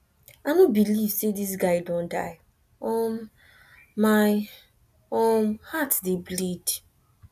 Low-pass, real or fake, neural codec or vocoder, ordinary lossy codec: 14.4 kHz; real; none; none